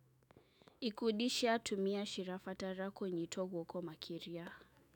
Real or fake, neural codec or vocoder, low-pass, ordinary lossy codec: fake; autoencoder, 48 kHz, 128 numbers a frame, DAC-VAE, trained on Japanese speech; 19.8 kHz; none